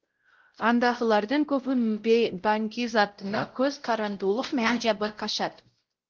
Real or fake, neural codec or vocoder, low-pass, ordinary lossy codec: fake; codec, 16 kHz, 0.5 kbps, X-Codec, WavLM features, trained on Multilingual LibriSpeech; 7.2 kHz; Opus, 16 kbps